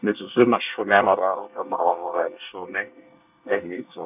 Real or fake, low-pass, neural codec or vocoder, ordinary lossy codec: fake; 3.6 kHz; codec, 24 kHz, 1 kbps, SNAC; none